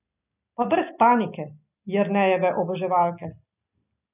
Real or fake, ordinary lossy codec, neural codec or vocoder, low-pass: real; none; none; 3.6 kHz